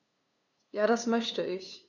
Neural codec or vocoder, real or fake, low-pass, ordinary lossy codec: codec, 16 kHz, 2 kbps, FunCodec, trained on LibriTTS, 25 frames a second; fake; 7.2 kHz; none